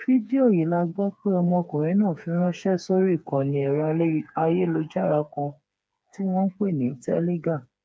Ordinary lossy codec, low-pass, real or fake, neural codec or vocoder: none; none; fake; codec, 16 kHz, 4 kbps, FreqCodec, smaller model